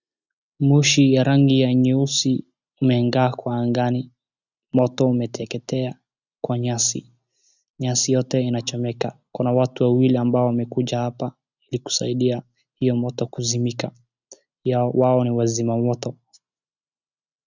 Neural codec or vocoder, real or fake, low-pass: none; real; 7.2 kHz